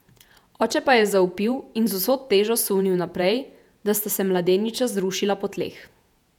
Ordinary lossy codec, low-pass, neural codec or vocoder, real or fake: none; 19.8 kHz; vocoder, 48 kHz, 128 mel bands, Vocos; fake